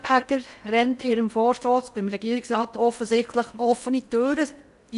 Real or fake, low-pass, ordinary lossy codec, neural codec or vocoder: fake; 10.8 kHz; none; codec, 16 kHz in and 24 kHz out, 0.6 kbps, FocalCodec, streaming, 4096 codes